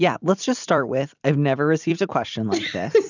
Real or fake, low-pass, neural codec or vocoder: fake; 7.2 kHz; vocoder, 44.1 kHz, 128 mel bands every 256 samples, BigVGAN v2